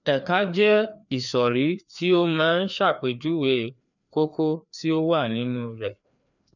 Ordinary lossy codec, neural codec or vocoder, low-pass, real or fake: none; codec, 16 kHz, 2 kbps, FreqCodec, larger model; 7.2 kHz; fake